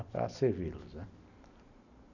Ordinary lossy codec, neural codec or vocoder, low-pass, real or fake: none; none; 7.2 kHz; real